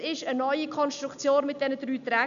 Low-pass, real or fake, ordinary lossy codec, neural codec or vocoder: 7.2 kHz; real; none; none